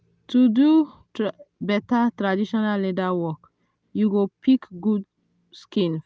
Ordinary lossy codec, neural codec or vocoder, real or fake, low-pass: none; none; real; none